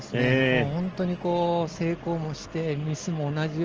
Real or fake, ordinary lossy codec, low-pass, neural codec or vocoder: real; Opus, 16 kbps; 7.2 kHz; none